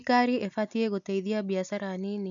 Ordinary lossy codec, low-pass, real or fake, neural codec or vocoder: none; 7.2 kHz; real; none